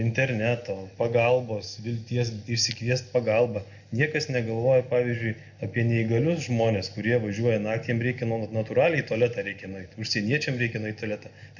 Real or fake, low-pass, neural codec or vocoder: real; 7.2 kHz; none